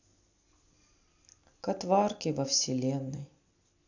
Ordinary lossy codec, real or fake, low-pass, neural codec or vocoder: none; real; 7.2 kHz; none